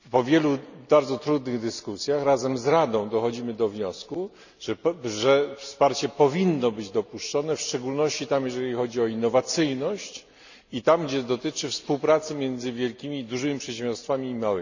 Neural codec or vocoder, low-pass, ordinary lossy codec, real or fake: none; 7.2 kHz; none; real